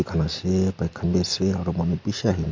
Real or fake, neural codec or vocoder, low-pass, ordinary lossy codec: real; none; 7.2 kHz; MP3, 48 kbps